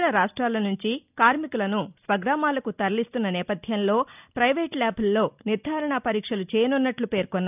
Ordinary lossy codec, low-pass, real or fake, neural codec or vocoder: none; 3.6 kHz; real; none